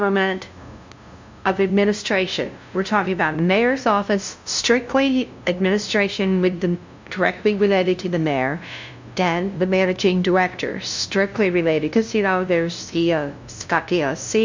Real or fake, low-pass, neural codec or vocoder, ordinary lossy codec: fake; 7.2 kHz; codec, 16 kHz, 0.5 kbps, FunCodec, trained on LibriTTS, 25 frames a second; MP3, 64 kbps